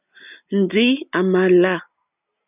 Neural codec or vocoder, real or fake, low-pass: none; real; 3.6 kHz